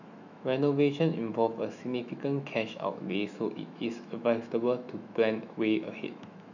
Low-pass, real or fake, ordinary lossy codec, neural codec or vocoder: 7.2 kHz; fake; none; autoencoder, 48 kHz, 128 numbers a frame, DAC-VAE, trained on Japanese speech